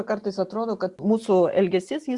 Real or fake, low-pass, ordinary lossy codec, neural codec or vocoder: real; 10.8 kHz; Opus, 64 kbps; none